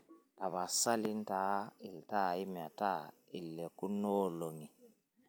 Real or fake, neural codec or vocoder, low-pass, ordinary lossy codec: real; none; none; none